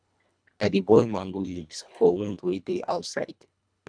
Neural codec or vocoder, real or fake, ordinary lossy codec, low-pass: codec, 24 kHz, 1.5 kbps, HILCodec; fake; none; 9.9 kHz